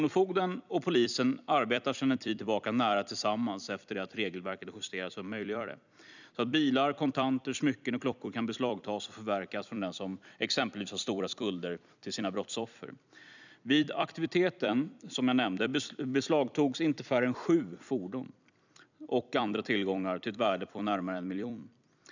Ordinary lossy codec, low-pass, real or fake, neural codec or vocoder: none; 7.2 kHz; fake; vocoder, 44.1 kHz, 128 mel bands every 256 samples, BigVGAN v2